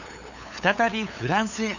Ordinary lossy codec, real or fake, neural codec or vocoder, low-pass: none; fake; codec, 16 kHz, 8 kbps, FunCodec, trained on LibriTTS, 25 frames a second; 7.2 kHz